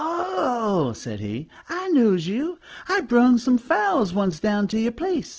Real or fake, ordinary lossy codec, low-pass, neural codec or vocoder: real; Opus, 16 kbps; 7.2 kHz; none